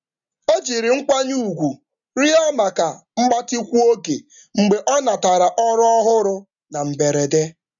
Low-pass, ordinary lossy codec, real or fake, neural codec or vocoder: 7.2 kHz; none; real; none